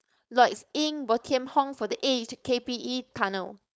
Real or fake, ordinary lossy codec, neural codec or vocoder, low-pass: fake; none; codec, 16 kHz, 4.8 kbps, FACodec; none